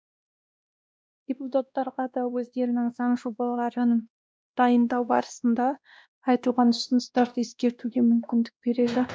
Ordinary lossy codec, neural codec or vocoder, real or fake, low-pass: none; codec, 16 kHz, 1 kbps, X-Codec, WavLM features, trained on Multilingual LibriSpeech; fake; none